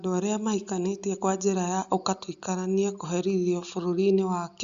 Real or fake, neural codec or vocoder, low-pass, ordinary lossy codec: real; none; 7.2 kHz; Opus, 64 kbps